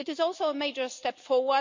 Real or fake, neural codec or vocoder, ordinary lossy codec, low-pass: real; none; MP3, 48 kbps; 7.2 kHz